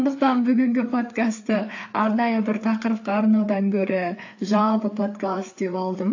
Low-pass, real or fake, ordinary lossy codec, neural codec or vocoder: 7.2 kHz; fake; AAC, 48 kbps; codec, 16 kHz, 4 kbps, FreqCodec, larger model